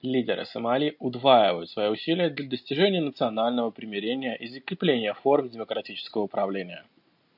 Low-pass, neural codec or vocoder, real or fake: 5.4 kHz; none; real